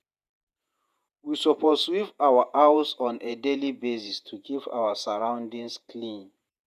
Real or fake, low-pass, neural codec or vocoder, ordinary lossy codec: fake; 14.4 kHz; vocoder, 48 kHz, 128 mel bands, Vocos; none